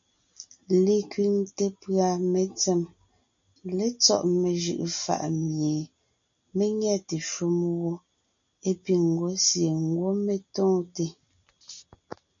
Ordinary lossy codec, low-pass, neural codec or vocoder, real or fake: MP3, 96 kbps; 7.2 kHz; none; real